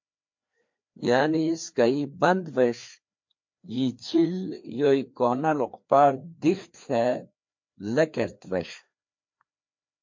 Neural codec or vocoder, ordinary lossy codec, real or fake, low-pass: codec, 16 kHz, 2 kbps, FreqCodec, larger model; MP3, 48 kbps; fake; 7.2 kHz